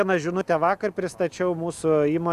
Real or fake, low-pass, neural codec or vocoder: real; 14.4 kHz; none